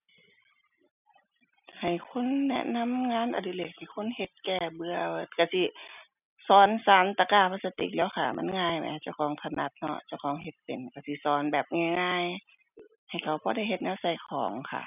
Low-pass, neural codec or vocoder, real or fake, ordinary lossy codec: 3.6 kHz; none; real; none